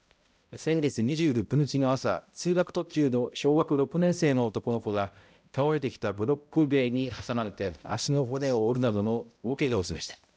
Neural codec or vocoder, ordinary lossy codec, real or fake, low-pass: codec, 16 kHz, 0.5 kbps, X-Codec, HuBERT features, trained on balanced general audio; none; fake; none